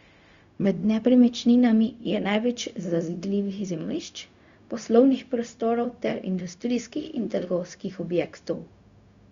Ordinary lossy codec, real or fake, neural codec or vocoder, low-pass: Opus, 64 kbps; fake; codec, 16 kHz, 0.4 kbps, LongCat-Audio-Codec; 7.2 kHz